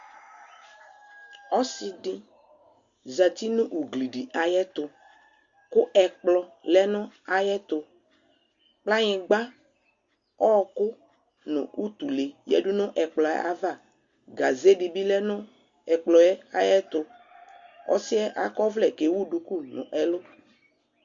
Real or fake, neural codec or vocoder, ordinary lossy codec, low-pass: real; none; Opus, 64 kbps; 7.2 kHz